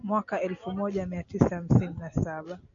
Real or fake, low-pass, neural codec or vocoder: real; 7.2 kHz; none